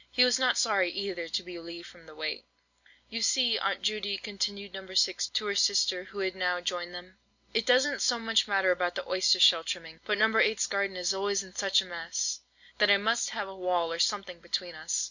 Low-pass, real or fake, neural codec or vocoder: 7.2 kHz; real; none